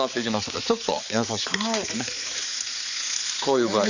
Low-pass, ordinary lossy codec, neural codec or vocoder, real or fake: 7.2 kHz; none; codec, 16 kHz, 4 kbps, X-Codec, HuBERT features, trained on balanced general audio; fake